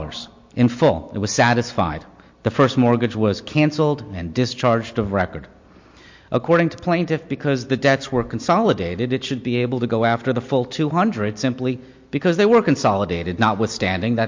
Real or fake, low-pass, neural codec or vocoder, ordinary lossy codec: real; 7.2 kHz; none; MP3, 48 kbps